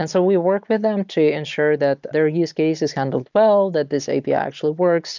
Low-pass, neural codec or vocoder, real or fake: 7.2 kHz; none; real